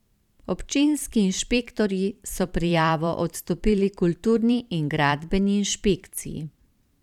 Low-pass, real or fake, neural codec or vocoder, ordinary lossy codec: 19.8 kHz; fake; vocoder, 44.1 kHz, 128 mel bands every 512 samples, BigVGAN v2; none